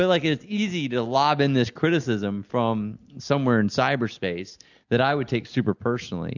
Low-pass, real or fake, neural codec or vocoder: 7.2 kHz; real; none